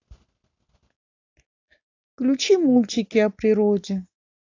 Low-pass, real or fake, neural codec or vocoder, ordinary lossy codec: 7.2 kHz; real; none; AAC, 48 kbps